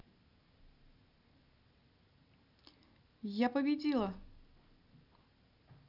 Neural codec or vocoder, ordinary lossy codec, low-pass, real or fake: none; none; 5.4 kHz; real